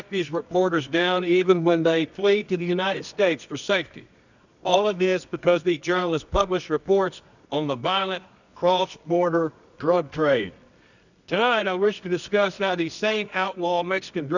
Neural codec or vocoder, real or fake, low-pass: codec, 24 kHz, 0.9 kbps, WavTokenizer, medium music audio release; fake; 7.2 kHz